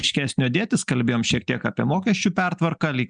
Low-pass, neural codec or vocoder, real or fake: 9.9 kHz; none; real